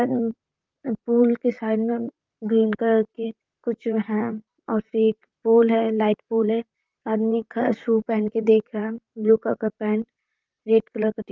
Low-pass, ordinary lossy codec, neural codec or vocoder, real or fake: 7.2 kHz; Opus, 24 kbps; vocoder, 44.1 kHz, 128 mel bands, Pupu-Vocoder; fake